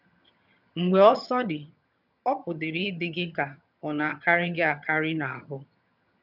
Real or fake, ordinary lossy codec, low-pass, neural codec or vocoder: fake; none; 5.4 kHz; vocoder, 22.05 kHz, 80 mel bands, HiFi-GAN